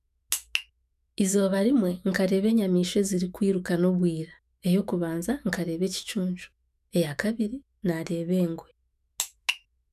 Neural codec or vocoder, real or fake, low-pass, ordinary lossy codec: autoencoder, 48 kHz, 128 numbers a frame, DAC-VAE, trained on Japanese speech; fake; 14.4 kHz; none